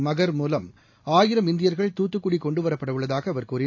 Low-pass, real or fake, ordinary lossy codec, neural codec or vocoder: 7.2 kHz; real; AAC, 48 kbps; none